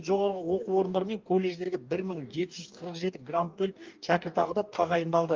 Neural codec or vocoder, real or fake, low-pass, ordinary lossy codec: codec, 44.1 kHz, 2.6 kbps, DAC; fake; 7.2 kHz; Opus, 24 kbps